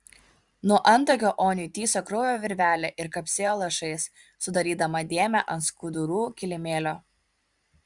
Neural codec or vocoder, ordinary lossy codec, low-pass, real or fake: vocoder, 44.1 kHz, 128 mel bands every 512 samples, BigVGAN v2; Opus, 64 kbps; 10.8 kHz; fake